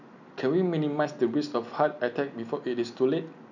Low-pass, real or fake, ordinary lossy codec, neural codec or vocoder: 7.2 kHz; real; none; none